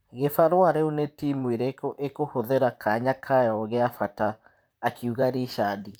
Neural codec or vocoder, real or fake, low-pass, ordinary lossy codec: vocoder, 44.1 kHz, 128 mel bands, Pupu-Vocoder; fake; none; none